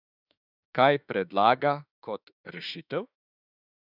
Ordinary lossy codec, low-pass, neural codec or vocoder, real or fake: none; 5.4 kHz; autoencoder, 48 kHz, 32 numbers a frame, DAC-VAE, trained on Japanese speech; fake